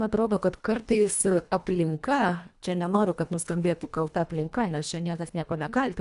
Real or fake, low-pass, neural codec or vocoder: fake; 10.8 kHz; codec, 24 kHz, 1.5 kbps, HILCodec